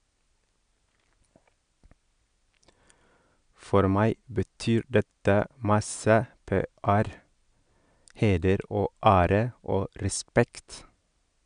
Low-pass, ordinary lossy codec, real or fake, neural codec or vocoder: 9.9 kHz; none; real; none